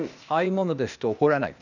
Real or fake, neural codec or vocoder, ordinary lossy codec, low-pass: fake; codec, 16 kHz, 0.8 kbps, ZipCodec; none; 7.2 kHz